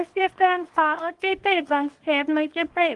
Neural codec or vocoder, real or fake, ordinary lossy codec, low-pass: codec, 24 kHz, 0.9 kbps, WavTokenizer, small release; fake; Opus, 24 kbps; 10.8 kHz